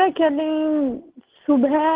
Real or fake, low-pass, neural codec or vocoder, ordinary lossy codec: real; 3.6 kHz; none; Opus, 16 kbps